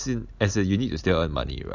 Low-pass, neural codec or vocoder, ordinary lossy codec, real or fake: 7.2 kHz; none; AAC, 48 kbps; real